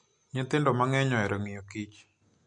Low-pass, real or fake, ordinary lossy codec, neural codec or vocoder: 9.9 kHz; real; MP3, 48 kbps; none